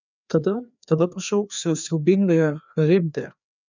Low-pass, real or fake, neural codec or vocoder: 7.2 kHz; fake; codec, 16 kHz, 2 kbps, FreqCodec, larger model